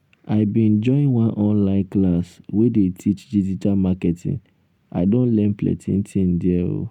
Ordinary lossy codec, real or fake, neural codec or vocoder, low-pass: none; real; none; 19.8 kHz